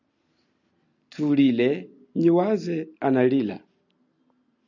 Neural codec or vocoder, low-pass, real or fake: none; 7.2 kHz; real